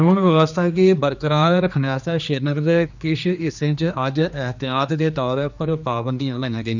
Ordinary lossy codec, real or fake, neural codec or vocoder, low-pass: none; fake; codec, 16 kHz, 2 kbps, X-Codec, HuBERT features, trained on general audio; 7.2 kHz